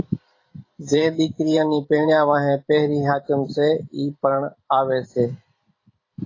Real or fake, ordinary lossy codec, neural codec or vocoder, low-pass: fake; AAC, 32 kbps; vocoder, 44.1 kHz, 128 mel bands every 512 samples, BigVGAN v2; 7.2 kHz